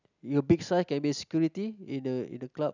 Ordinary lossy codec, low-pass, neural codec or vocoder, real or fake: none; 7.2 kHz; none; real